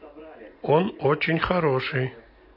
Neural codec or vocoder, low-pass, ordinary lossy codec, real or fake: none; 5.4 kHz; MP3, 32 kbps; real